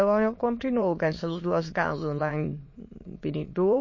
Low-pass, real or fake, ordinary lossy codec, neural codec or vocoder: 7.2 kHz; fake; MP3, 32 kbps; autoencoder, 22.05 kHz, a latent of 192 numbers a frame, VITS, trained on many speakers